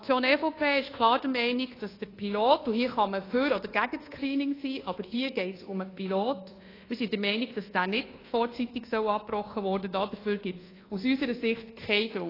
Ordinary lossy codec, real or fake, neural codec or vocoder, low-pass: AAC, 24 kbps; fake; codec, 24 kHz, 1.2 kbps, DualCodec; 5.4 kHz